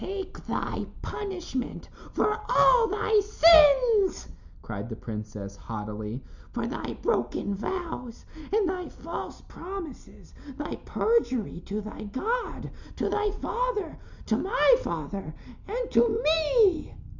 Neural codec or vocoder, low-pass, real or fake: none; 7.2 kHz; real